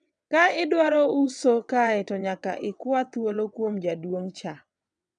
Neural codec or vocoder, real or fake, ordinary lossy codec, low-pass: vocoder, 22.05 kHz, 80 mel bands, WaveNeXt; fake; none; 9.9 kHz